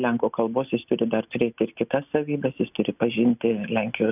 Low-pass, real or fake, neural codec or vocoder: 3.6 kHz; real; none